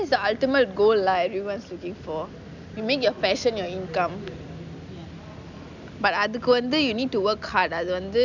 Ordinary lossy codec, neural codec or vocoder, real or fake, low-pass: none; none; real; 7.2 kHz